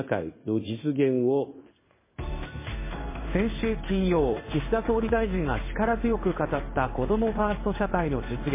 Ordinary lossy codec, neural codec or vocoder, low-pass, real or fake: MP3, 16 kbps; codec, 16 kHz in and 24 kHz out, 1 kbps, XY-Tokenizer; 3.6 kHz; fake